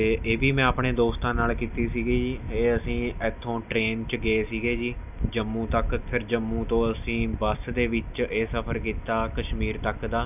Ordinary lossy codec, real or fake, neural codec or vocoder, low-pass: none; real; none; 3.6 kHz